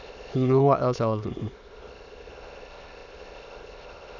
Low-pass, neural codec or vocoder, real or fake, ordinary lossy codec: 7.2 kHz; autoencoder, 22.05 kHz, a latent of 192 numbers a frame, VITS, trained on many speakers; fake; none